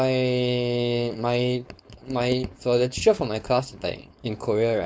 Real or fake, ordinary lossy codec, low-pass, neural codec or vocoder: fake; none; none; codec, 16 kHz, 4.8 kbps, FACodec